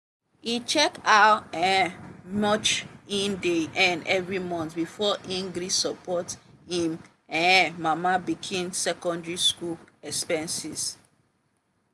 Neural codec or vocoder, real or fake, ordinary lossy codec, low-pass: none; real; none; none